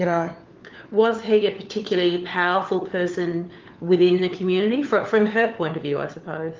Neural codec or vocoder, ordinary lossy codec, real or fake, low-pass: codec, 16 kHz, 4 kbps, FunCodec, trained on LibriTTS, 50 frames a second; Opus, 32 kbps; fake; 7.2 kHz